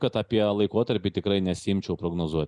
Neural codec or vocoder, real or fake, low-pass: none; real; 10.8 kHz